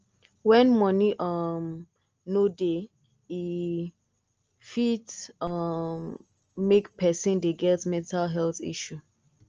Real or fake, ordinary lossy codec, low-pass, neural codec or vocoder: real; Opus, 24 kbps; 7.2 kHz; none